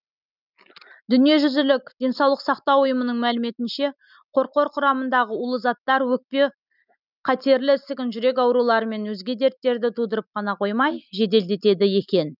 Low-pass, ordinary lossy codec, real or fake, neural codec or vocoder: 5.4 kHz; none; real; none